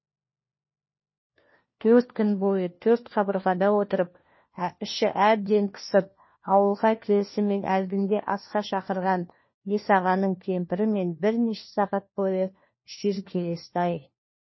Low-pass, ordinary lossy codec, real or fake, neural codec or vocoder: 7.2 kHz; MP3, 24 kbps; fake; codec, 16 kHz, 1 kbps, FunCodec, trained on LibriTTS, 50 frames a second